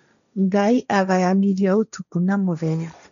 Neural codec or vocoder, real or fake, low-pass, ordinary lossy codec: codec, 16 kHz, 1.1 kbps, Voila-Tokenizer; fake; 7.2 kHz; none